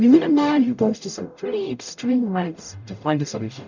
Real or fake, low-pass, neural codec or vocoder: fake; 7.2 kHz; codec, 44.1 kHz, 0.9 kbps, DAC